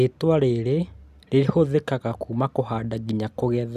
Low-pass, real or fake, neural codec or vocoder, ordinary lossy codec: 14.4 kHz; real; none; none